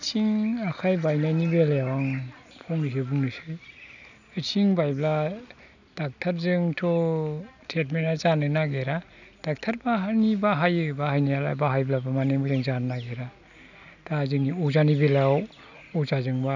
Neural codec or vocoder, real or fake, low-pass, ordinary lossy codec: none; real; 7.2 kHz; none